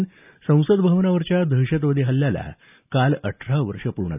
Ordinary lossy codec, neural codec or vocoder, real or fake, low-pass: none; none; real; 3.6 kHz